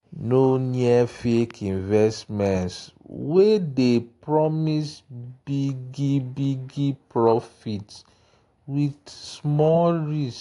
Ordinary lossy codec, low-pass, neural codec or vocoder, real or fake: AAC, 48 kbps; 10.8 kHz; none; real